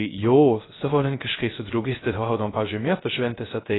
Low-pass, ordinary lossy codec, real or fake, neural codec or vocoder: 7.2 kHz; AAC, 16 kbps; fake; codec, 16 kHz, 0.3 kbps, FocalCodec